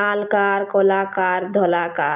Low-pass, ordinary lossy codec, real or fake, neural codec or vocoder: 3.6 kHz; none; real; none